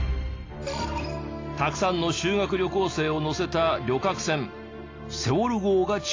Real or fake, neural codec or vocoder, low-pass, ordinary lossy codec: real; none; 7.2 kHz; AAC, 32 kbps